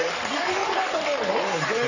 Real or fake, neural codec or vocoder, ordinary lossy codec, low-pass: fake; codec, 44.1 kHz, 3.4 kbps, Pupu-Codec; none; 7.2 kHz